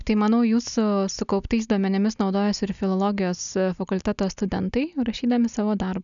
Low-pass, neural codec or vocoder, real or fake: 7.2 kHz; none; real